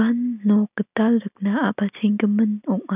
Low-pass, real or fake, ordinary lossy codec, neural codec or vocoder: 3.6 kHz; real; none; none